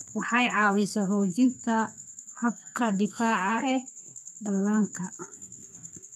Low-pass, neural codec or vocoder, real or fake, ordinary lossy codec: 14.4 kHz; codec, 32 kHz, 1.9 kbps, SNAC; fake; none